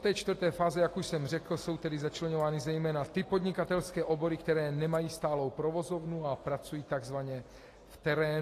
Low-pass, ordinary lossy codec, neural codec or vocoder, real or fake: 14.4 kHz; AAC, 48 kbps; none; real